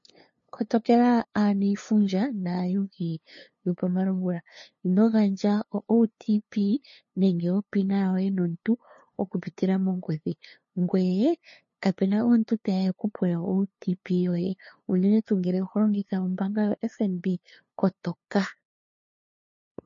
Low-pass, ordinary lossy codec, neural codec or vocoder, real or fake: 7.2 kHz; MP3, 32 kbps; codec, 16 kHz, 2 kbps, FunCodec, trained on LibriTTS, 25 frames a second; fake